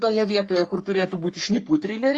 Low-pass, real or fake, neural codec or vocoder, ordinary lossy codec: 10.8 kHz; fake; codec, 44.1 kHz, 3.4 kbps, Pupu-Codec; Opus, 64 kbps